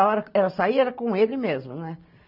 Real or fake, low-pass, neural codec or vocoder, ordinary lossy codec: real; 5.4 kHz; none; none